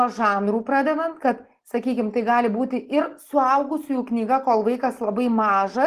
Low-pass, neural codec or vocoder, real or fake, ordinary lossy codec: 14.4 kHz; none; real; Opus, 16 kbps